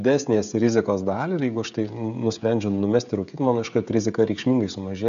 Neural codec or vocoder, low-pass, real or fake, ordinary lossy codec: codec, 16 kHz, 16 kbps, FreqCodec, smaller model; 7.2 kHz; fake; AAC, 96 kbps